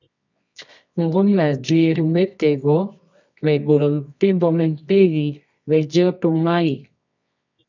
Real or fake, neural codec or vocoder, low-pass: fake; codec, 24 kHz, 0.9 kbps, WavTokenizer, medium music audio release; 7.2 kHz